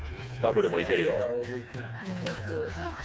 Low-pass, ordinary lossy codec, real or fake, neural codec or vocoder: none; none; fake; codec, 16 kHz, 2 kbps, FreqCodec, smaller model